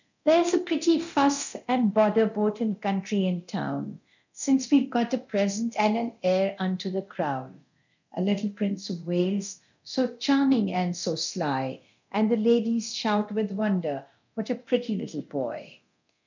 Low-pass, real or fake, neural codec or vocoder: 7.2 kHz; fake; codec, 24 kHz, 0.9 kbps, DualCodec